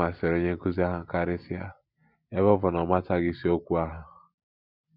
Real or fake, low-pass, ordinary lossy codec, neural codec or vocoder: real; 5.4 kHz; none; none